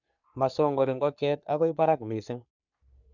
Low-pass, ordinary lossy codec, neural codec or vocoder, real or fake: 7.2 kHz; none; codec, 44.1 kHz, 3.4 kbps, Pupu-Codec; fake